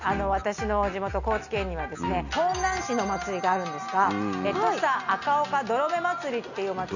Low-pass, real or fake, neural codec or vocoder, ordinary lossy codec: 7.2 kHz; real; none; none